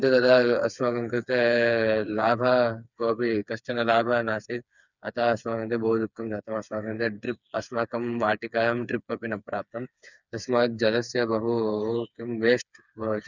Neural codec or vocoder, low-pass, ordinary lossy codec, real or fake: codec, 16 kHz, 4 kbps, FreqCodec, smaller model; 7.2 kHz; none; fake